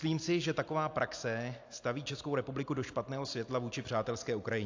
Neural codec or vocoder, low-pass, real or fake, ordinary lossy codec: none; 7.2 kHz; real; AAC, 48 kbps